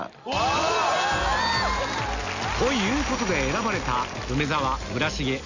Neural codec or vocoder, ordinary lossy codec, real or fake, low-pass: none; none; real; 7.2 kHz